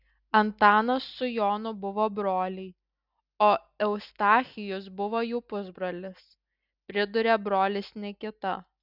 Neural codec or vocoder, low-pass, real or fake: none; 5.4 kHz; real